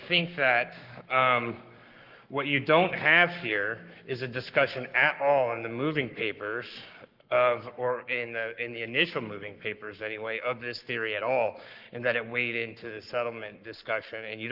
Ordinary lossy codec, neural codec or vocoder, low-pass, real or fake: Opus, 24 kbps; codec, 44.1 kHz, 7.8 kbps, Pupu-Codec; 5.4 kHz; fake